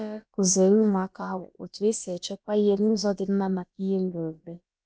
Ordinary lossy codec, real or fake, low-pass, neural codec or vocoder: none; fake; none; codec, 16 kHz, about 1 kbps, DyCAST, with the encoder's durations